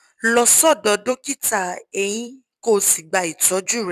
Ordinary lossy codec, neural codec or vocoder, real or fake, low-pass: none; vocoder, 44.1 kHz, 128 mel bands every 256 samples, BigVGAN v2; fake; 14.4 kHz